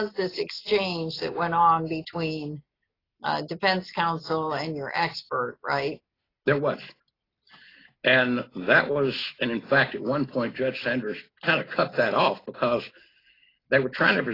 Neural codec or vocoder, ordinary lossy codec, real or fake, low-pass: none; AAC, 24 kbps; real; 5.4 kHz